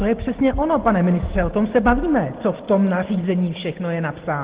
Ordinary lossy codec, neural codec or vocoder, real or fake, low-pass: Opus, 16 kbps; none; real; 3.6 kHz